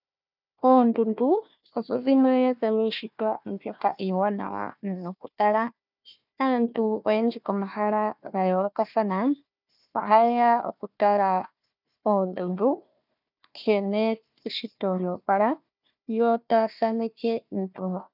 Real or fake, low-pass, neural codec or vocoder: fake; 5.4 kHz; codec, 16 kHz, 1 kbps, FunCodec, trained on Chinese and English, 50 frames a second